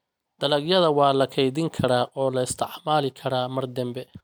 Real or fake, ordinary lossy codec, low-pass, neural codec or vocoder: real; none; none; none